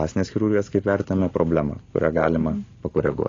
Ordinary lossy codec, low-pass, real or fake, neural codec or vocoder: AAC, 32 kbps; 7.2 kHz; real; none